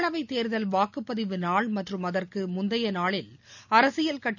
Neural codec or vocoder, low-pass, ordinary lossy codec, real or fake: none; 7.2 kHz; none; real